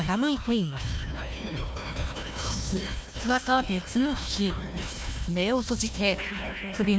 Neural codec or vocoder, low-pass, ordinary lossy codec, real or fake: codec, 16 kHz, 1 kbps, FunCodec, trained on Chinese and English, 50 frames a second; none; none; fake